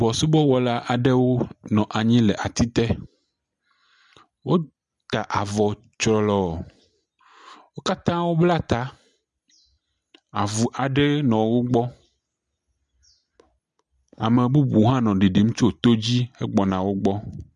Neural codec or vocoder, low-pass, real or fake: none; 10.8 kHz; real